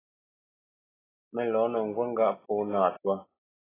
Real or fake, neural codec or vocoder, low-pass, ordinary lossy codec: real; none; 3.6 kHz; AAC, 16 kbps